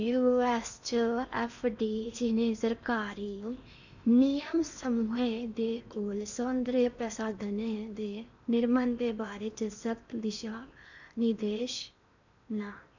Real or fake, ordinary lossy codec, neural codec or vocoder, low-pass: fake; none; codec, 16 kHz in and 24 kHz out, 0.8 kbps, FocalCodec, streaming, 65536 codes; 7.2 kHz